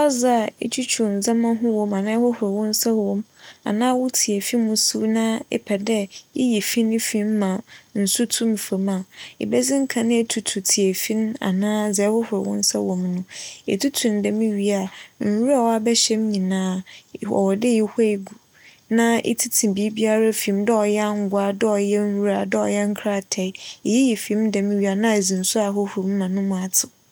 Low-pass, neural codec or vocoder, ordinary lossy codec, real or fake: none; none; none; real